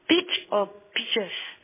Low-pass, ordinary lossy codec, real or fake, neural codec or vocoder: 3.6 kHz; MP3, 16 kbps; fake; codec, 16 kHz in and 24 kHz out, 1 kbps, XY-Tokenizer